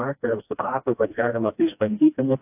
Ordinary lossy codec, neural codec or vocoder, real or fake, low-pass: AAC, 32 kbps; codec, 16 kHz, 1 kbps, FreqCodec, smaller model; fake; 3.6 kHz